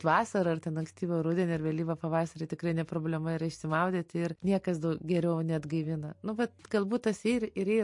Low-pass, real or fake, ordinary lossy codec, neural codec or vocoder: 10.8 kHz; real; MP3, 48 kbps; none